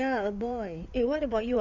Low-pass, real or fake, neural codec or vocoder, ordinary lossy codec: 7.2 kHz; fake; codec, 16 kHz in and 24 kHz out, 2.2 kbps, FireRedTTS-2 codec; none